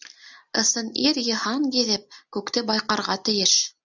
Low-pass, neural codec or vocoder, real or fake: 7.2 kHz; none; real